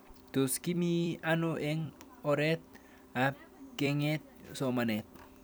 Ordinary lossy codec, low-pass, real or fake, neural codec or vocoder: none; none; fake; vocoder, 44.1 kHz, 128 mel bands every 256 samples, BigVGAN v2